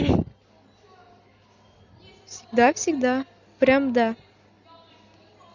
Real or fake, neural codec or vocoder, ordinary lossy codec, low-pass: real; none; none; 7.2 kHz